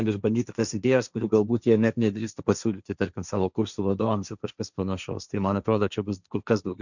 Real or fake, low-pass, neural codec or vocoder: fake; 7.2 kHz; codec, 16 kHz, 1.1 kbps, Voila-Tokenizer